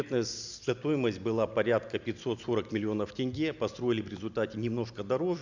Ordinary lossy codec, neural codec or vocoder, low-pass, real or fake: none; none; 7.2 kHz; real